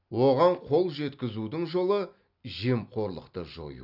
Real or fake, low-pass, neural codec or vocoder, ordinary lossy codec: real; 5.4 kHz; none; none